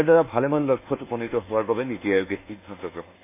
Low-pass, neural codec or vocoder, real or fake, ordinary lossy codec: 3.6 kHz; codec, 24 kHz, 1.2 kbps, DualCodec; fake; none